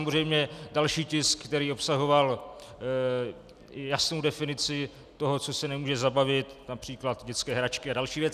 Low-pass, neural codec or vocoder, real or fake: 14.4 kHz; none; real